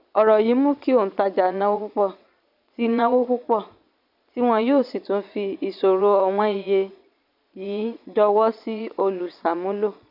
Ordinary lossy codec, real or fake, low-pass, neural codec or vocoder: none; fake; 5.4 kHz; vocoder, 22.05 kHz, 80 mel bands, Vocos